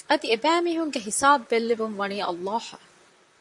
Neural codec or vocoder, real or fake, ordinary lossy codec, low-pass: vocoder, 44.1 kHz, 128 mel bands, Pupu-Vocoder; fake; MP3, 64 kbps; 10.8 kHz